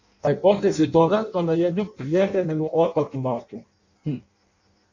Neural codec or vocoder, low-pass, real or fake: codec, 16 kHz in and 24 kHz out, 0.6 kbps, FireRedTTS-2 codec; 7.2 kHz; fake